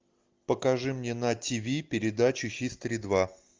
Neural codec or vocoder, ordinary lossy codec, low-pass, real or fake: none; Opus, 24 kbps; 7.2 kHz; real